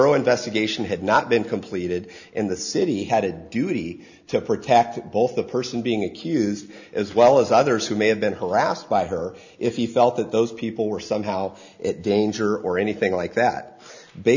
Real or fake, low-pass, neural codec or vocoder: real; 7.2 kHz; none